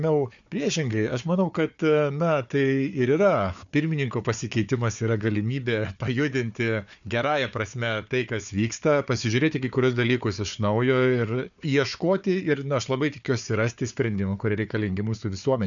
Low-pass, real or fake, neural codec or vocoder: 7.2 kHz; fake; codec, 16 kHz, 4 kbps, FunCodec, trained on Chinese and English, 50 frames a second